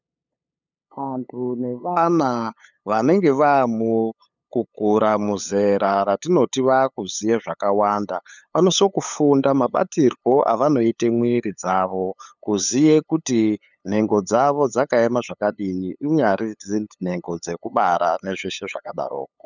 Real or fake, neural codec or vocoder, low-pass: fake; codec, 16 kHz, 8 kbps, FunCodec, trained on LibriTTS, 25 frames a second; 7.2 kHz